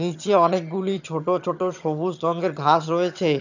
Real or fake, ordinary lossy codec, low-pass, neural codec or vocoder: fake; none; 7.2 kHz; vocoder, 22.05 kHz, 80 mel bands, HiFi-GAN